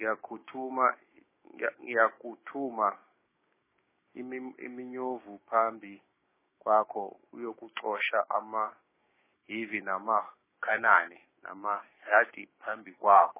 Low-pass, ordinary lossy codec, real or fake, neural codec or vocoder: 3.6 kHz; MP3, 16 kbps; fake; codec, 16 kHz, 6 kbps, DAC